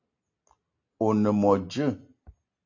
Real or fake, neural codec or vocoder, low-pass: real; none; 7.2 kHz